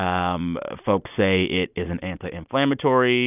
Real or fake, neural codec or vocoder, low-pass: real; none; 3.6 kHz